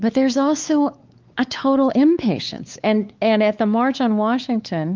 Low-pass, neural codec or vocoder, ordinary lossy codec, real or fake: 7.2 kHz; codec, 16 kHz, 4 kbps, X-Codec, WavLM features, trained on Multilingual LibriSpeech; Opus, 32 kbps; fake